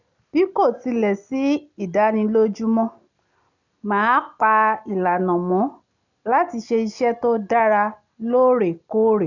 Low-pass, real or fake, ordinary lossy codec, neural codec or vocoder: 7.2 kHz; real; none; none